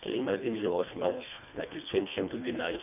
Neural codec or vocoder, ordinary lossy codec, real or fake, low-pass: codec, 24 kHz, 1.5 kbps, HILCodec; none; fake; 3.6 kHz